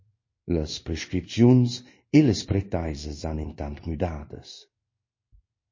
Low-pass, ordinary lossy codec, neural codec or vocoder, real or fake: 7.2 kHz; MP3, 32 kbps; codec, 16 kHz in and 24 kHz out, 1 kbps, XY-Tokenizer; fake